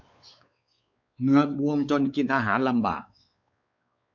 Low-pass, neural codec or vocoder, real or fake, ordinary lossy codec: 7.2 kHz; codec, 16 kHz, 4 kbps, X-Codec, WavLM features, trained on Multilingual LibriSpeech; fake; none